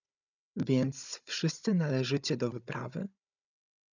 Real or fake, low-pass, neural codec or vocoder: fake; 7.2 kHz; codec, 16 kHz, 16 kbps, FunCodec, trained on Chinese and English, 50 frames a second